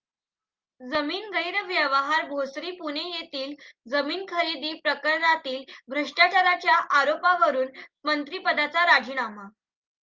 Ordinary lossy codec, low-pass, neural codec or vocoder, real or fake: Opus, 24 kbps; 7.2 kHz; none; real